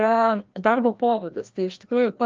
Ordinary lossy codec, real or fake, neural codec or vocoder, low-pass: Opus, 24 kbps; fake; codec, 16 kHz, 1 kbps, FreqCodec, larger model; 7.2 kHz